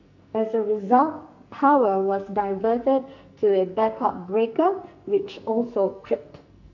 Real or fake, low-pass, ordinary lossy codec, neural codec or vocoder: fake; 7.2 kHz; none; codec, 44.1 kHz, 2.6 kbps, SNAC